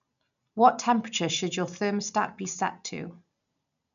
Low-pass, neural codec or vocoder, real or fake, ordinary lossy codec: 7.2 kHz; none; real; none